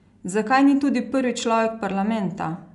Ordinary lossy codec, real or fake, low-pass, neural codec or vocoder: none; real; 10.8 kHz; none